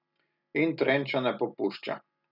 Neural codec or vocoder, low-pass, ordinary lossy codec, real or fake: none; 5.4 kHz; none; real